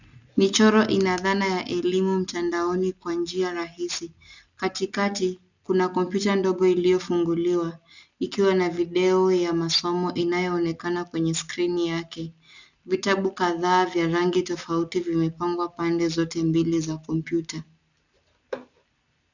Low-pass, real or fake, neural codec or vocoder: 7.2 kHz; real; none